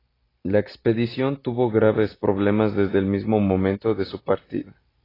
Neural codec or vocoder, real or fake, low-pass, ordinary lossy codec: none; real; 5.4 kHz; AAC, 24 kbps